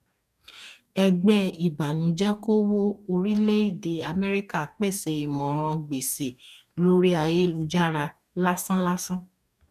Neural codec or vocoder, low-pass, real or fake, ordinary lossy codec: codec, 44.1 kHz, 2.6 kbps, DAC; 14.4 kHz; fake; none